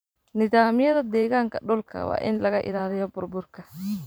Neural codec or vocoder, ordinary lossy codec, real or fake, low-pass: vocoder, 44.1 kHz, 128 mel bands every 512 samples, BigVGAN v2; none; fake; none